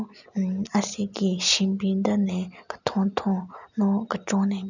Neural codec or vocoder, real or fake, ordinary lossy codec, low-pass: none; real; none; 7.2 kHz